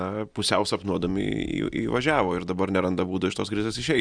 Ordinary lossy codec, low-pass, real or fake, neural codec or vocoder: Opus, 64 kbps; 9.9 kHz; real; none